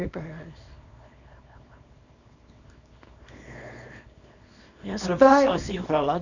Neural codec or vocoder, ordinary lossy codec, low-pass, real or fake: codec, 24 kHz, 0.9 kbps, WavTokenizer, small release; none; 7.2 kHz; fake